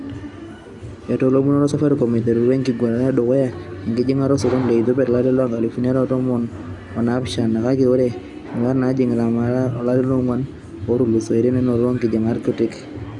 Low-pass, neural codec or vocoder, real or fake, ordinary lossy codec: 10.8 kHz; none; real; Opus, 64 kbps